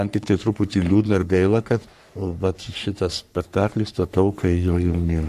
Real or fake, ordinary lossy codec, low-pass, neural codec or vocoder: fake; AAC, 96 kbps; 14.4 kHz; codec, 44.1 kHz, 3.4 kbps, Pupu-Codec